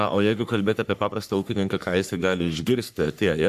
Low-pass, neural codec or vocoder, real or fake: 14.4 kHz; codec, 44.1 kHz, 3.4 kbps, Pupu-Codec; fake